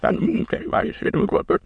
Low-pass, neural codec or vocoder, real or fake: 9.9 kHz; autoencoder, 22.05 kHz, a latent of 192 numbers a frame, VITS, trained on many speakers; fake